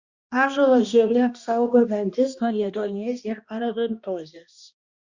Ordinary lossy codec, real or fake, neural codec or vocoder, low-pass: Opus, 64 kbps; fake; codec, 16 kHz, 1 kbps, X-Codec, HuBERT features, trained on balanced general audio; 7.2 kHz